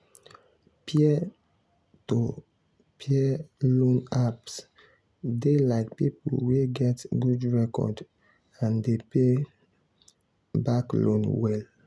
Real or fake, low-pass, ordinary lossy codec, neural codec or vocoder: real; none; none; none